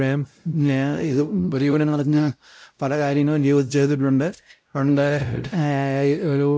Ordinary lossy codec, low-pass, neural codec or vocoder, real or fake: none; none; codec, 16 kHz, 0.5 kbps, X-Codec, WavLM features, trained on Multilingual LibriSpeech; fake